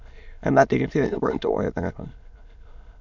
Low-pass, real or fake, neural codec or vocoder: 7.2 kHz; fake; autoencoder, 22.05 kHz, a latent of 192 numbers a frame, VITS, trained on many speakers